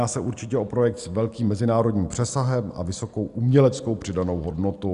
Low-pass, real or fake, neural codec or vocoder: 10.8 kHz; real; none